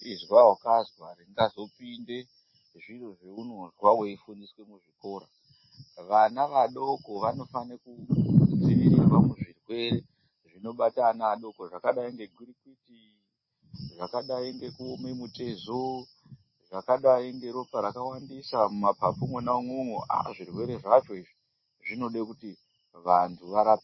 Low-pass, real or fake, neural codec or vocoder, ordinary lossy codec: 7.2 kHz; real; none; MP3, 24 kbps